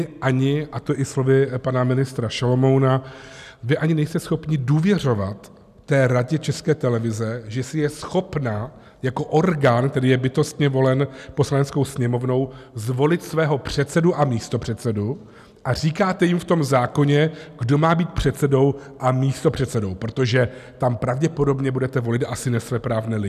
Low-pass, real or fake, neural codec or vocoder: 14.4 kHz; real; none